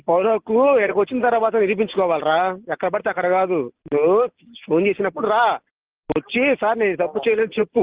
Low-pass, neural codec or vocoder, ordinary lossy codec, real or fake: 3.6 kHz; none; Opus, 16 kbps; real